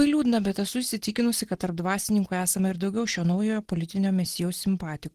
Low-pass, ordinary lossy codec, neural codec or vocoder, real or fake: 14.4 kHz; Opus, 16 kbps; none; real